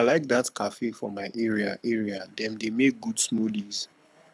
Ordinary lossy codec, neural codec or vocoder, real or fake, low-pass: none; codec, 24 kHz, 6 kbps, HILCodec; fake; none